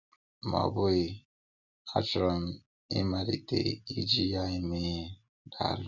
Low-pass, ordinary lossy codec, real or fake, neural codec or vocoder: 7.2 kHz; none; real; none